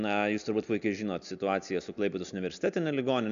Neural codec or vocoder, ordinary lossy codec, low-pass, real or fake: none; MP3, 96 kbps; 7.2 kHz; real